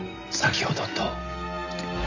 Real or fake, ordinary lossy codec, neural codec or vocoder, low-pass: real; none; none; 7.2 kHz